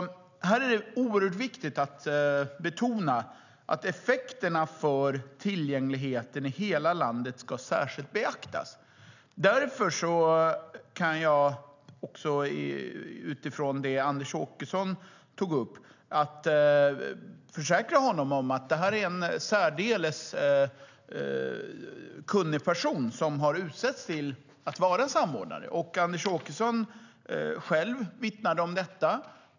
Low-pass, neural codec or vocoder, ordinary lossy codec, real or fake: 7.2 kHz; none; none; real